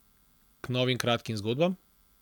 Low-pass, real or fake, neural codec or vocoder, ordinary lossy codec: 19.8 kHz; real; none; none